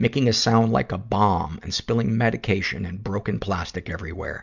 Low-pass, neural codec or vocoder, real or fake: 7.2 kHz; none; real